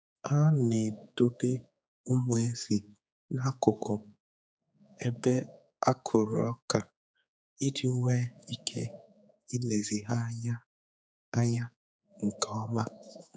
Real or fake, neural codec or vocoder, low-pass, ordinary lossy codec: fake; codec, 16 kHz, 4 kbps, X-Codec, HuBERT features, trained on general audio; none; none